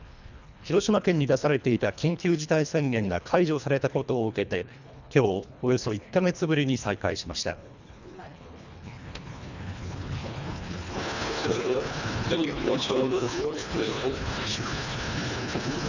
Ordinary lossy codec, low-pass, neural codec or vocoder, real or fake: none; 7.2 kHz; codec, 24 kHz, 1.5 kbps, HILCodec; fake